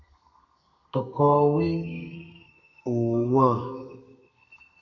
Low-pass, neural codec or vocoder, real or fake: 7.2 kHz; codec, 32 kHz, 1.9 kbps, SNAC; fake